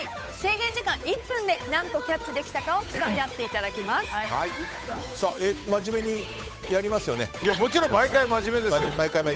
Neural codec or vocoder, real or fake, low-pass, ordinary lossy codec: codec, 16 kHz, 8 kbps, FunCodec, trained on Chinese and English, 25 frames a second; fake; none; none